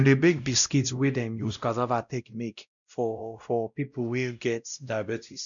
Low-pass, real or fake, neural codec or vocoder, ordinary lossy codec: 7.2 kHz; fake; codec, 16 kHz, 0.5 kbps, X-Codec, WavLM features, trained on Multilingual LibriSpeech; none